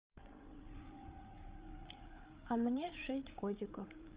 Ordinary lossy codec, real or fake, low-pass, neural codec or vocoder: Opus, 32 kbps; fake; 3.6 kHz; codec, 16 kHz, 8 kbps, FreqCodec, larger model